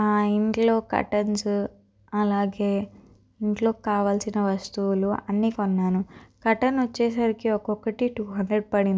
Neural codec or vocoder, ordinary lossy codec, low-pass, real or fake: none; none; none; real